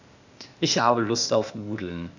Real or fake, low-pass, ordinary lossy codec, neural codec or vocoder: fake; 7.2 kHz; none; codec, 16 kHz, 0.8 kbps, ZipCodec